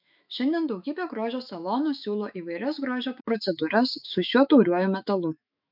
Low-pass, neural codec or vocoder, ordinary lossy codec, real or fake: 5.4 kHz; autoencoder, 48 kHz, 128 numbers a frame, DAC-VAE, trained on Japanese speech; MP3, 48 kbps; fake